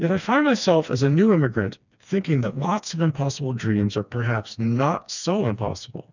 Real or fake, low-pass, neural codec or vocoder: fake; 7.2 kHz; codec, 16 kHz, 2 kbps, FreqCodec, smaller model